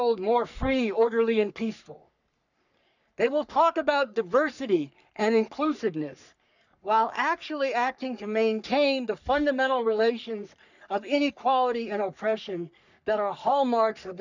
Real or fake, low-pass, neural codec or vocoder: fake; 7.2 kHz; codec, 44.1 kHz, 3.4 kbps, Pupu-Codec